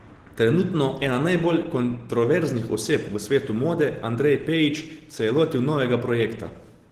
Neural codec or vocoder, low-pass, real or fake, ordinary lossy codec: none; 14.4 kHz; real; Opus, 16 kbps